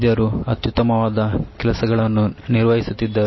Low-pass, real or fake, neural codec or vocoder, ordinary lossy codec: 7.2 kHz; real; none; MP3, 24 kbps